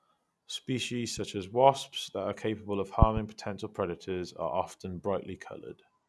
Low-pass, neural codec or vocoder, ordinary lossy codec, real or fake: none; none; none; real